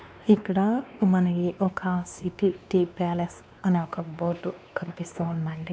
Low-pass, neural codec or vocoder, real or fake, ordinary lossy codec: none; codec, 16 kHz, 2 kbps, X-Codec, WavLM features, trained on Multilingual LibriSpeech; fake; none